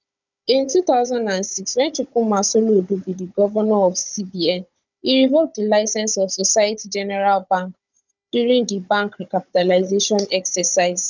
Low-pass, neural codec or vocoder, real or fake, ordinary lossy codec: 7.2 kHz; codec, 16 kHz, 16 kbps, FunCodec, trained on Chinese and English, 50 frames a second; fake; none